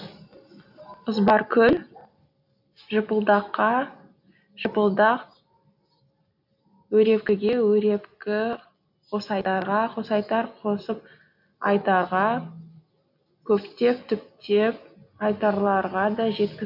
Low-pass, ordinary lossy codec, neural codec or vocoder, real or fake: 5.4 kHz; none; none; real